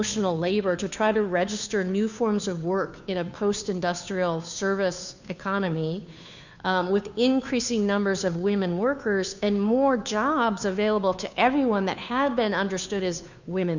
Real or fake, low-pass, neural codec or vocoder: fake; 7.2 kHz; codec, 16 kHz, 2 kbps, FunCodec, trained on Chinese and English, 25 frames a second